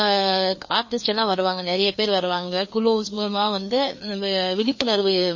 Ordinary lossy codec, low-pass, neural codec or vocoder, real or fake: MP3, 32 kbps; 7.2 kHz; codec, 16 kHz, 2 kbps, FreqCodec, larger model; fake